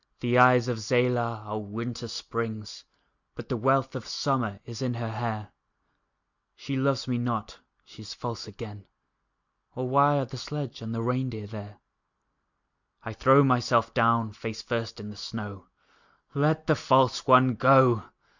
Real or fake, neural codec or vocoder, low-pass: real; none; 7.2 kHz